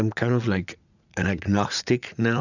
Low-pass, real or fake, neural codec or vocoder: 7.2 kHz; fake; vocoder, 44.1 kHz, 80 mel bands, Vocos